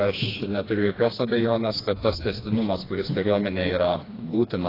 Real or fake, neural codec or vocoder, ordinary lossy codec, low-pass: fake; codec, 16 kHz, 2 kbps, FreqCodec, smaller model; AAC, 24 kbps; 5.4 kHz